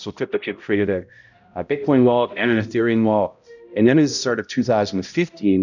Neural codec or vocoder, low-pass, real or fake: codec, 16 kHz, 0.5 kbps, X-Codec, HuBERT features, trained on balanced general audio; 7.2 kHz; fake